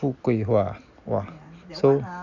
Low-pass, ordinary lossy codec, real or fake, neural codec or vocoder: 7.2 kHz; none; real; none